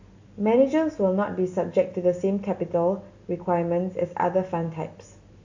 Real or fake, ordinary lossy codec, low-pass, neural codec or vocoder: real; AAC, 48 kbps; 7.2 kHz; none